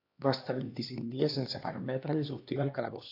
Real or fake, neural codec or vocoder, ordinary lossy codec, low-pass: fake; codec, 16 kHz, 2 kbps, X-Codec, HuBERT features, trained on LibriSpeech; AAC, 32 kbps; 5.4 kHz